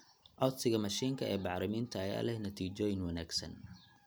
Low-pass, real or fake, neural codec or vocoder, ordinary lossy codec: none; real; none; none